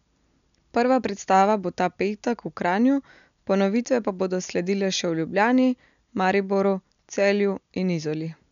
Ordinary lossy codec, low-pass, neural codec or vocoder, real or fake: none; 7.2 kHz; none; real